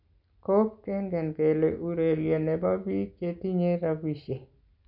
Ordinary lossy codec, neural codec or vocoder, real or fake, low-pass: none; none; real; 5.4 kHz